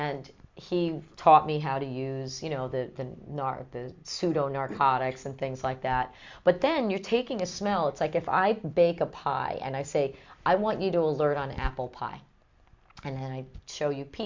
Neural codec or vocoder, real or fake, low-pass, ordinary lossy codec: none; real; 7.2 kHz; MP3, 64 kbps